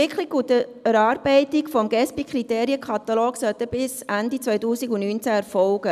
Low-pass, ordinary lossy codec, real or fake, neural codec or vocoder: 14.4 kHz; none; real; none